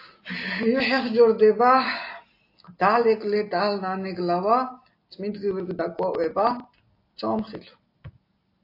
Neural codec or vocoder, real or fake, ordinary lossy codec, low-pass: none; real; AAC, 32 kbps; 5.4 kHz